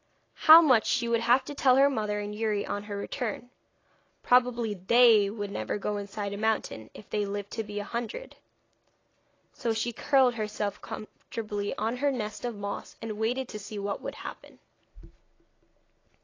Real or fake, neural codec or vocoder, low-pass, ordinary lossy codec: real; none; 7.2 kHz; AAC, 32 kbps